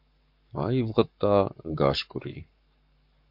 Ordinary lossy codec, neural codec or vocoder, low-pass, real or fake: MP3, 48 kbps; codec, 44.1 kHz, 7.8 kbps, Pupu-Codec; 5.4 kHz; fake